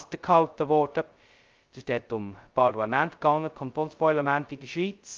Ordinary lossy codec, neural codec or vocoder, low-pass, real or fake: Opus, 32 kbps; codec, 16 kHz, 0.2 kbps, FocalCodec; 7.2 kHz; fake